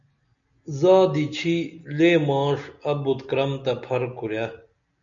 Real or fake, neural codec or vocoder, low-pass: real; none; 7.2 kHz